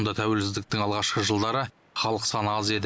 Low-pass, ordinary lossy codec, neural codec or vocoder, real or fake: none; none; none; real